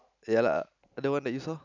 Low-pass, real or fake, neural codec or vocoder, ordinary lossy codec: 7.2 kHz; real; none; none